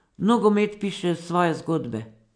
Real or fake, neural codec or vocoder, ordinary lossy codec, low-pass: real; none; none; 9.9 kHz